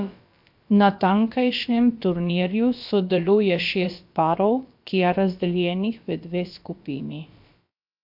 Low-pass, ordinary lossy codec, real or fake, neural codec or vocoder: 5.4 kHz; MP3, 48 kbps; fake; codec, 16 kHz, about 1 kbps, DyCAST, with the encoder's durations